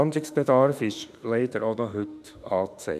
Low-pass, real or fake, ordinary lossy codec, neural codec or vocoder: 14.4 kHz; fake; none; autoencoder, 48 kHz, 32 numbers a frame, DAC-VAE, trained on Japanese speech